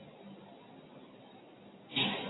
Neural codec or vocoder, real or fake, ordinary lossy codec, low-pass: none; real; AAC, 16 kbps; 7.2 kHz